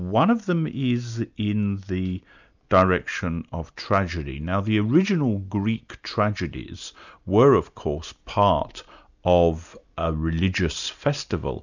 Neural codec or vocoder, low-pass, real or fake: none; 7.2 kHz; real